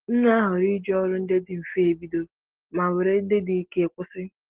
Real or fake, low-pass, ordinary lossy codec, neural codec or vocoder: real; 3.6 kHz; Opus, 16 kbps; none